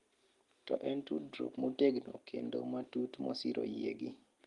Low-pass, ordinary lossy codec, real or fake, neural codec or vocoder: 10.8 kHz; Opus, 32 kbps; real; none